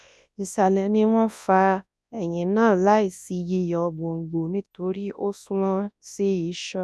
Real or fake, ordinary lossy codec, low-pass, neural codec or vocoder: fake; none; none; codec, 24 kHz, 0.9 kbps, WavTokenizer, large speech release